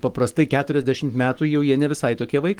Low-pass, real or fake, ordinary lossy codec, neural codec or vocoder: 19.8 kHz; fake; Opus, 24 kbps; codec, 44.1 kHz, 7.8 kbps, Pupu-Codec